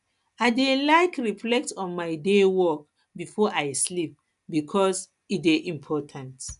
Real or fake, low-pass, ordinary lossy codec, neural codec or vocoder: real; 10.8 kHz; none; none